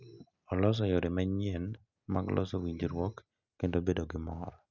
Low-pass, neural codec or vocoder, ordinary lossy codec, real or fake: 7.2 kHz; none; none; real